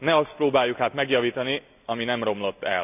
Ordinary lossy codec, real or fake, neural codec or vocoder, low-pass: none; real; none; 3.6 kHz